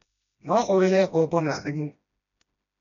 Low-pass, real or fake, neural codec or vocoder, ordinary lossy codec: 7.2 kHz; fake; codec, 16 kHz, 1 kbps, FreqCodec, smaller model; none